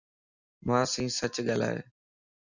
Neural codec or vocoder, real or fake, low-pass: none; real; 7.2 kHz